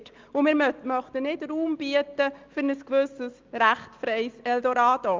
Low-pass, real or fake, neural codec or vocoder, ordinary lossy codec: 7.2 kHz; real; none; Opus, 24 kbps